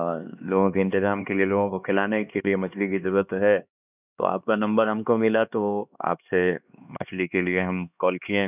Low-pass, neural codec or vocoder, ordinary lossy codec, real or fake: 3.6 kHz; codec, 16 kHz, 2 kbps, X-Codec, HuBERT features, trained on LibriSpeech; none; fake